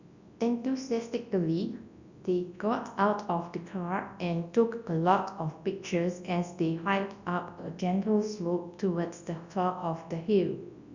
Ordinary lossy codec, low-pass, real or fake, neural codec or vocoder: Opus, 64 kbps; 7.2 kHz; fake; codec, 24 kHz, 0.9 kbps, WavTokenizer, large speech release